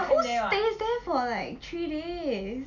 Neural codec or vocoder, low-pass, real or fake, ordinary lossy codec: none; 7.2 kHz; real; none